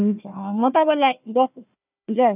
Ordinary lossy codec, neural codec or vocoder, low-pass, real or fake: none; codec, 16 kHz, 1 kbps, FunCodec, trained on Chinese and English, 50 frames a second; 3.6 kHz; fake